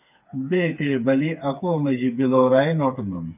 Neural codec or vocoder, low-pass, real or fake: codec, 16 kHz, 4 kbps, FreqCodec, smaller model; 3.6 kHz; fake